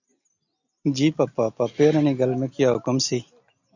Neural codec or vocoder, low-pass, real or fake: none; 7.2 kHz; real